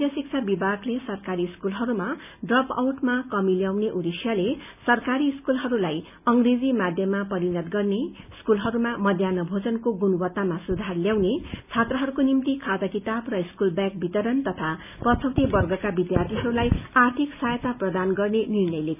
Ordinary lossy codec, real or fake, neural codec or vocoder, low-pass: none; real; none; 3.6 kHz